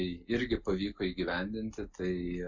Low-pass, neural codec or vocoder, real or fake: 7.2 kHz; none; real